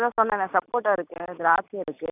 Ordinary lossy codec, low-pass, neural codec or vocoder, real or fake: none; 3.6 kHz; none; real